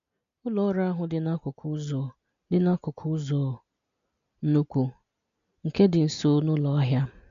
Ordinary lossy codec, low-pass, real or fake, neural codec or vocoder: MP3, 64 kbps; 7.2 kHz; real; none